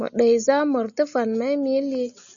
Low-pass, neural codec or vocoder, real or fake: 7.2 kHz; none; real